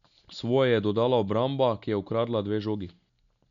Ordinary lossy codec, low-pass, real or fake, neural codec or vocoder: none; 7.2 kHz; real; none